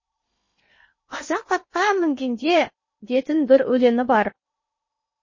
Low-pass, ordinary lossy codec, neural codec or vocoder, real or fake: 7.2 kHz; MP3, 32 kbps; codec, 16 kHz in and 24 kHz out, 0.8 kbps, FocalCodec, streaming, 65536 codes; fake